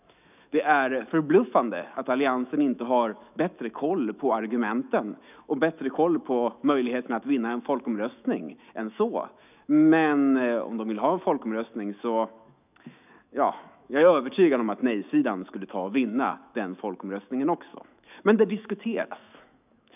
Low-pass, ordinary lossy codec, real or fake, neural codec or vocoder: 3.6 kHz; none; fake; autoencoder, 48 kHz, 128 numbers a frame, DAC-VAE, trained on Japanese speech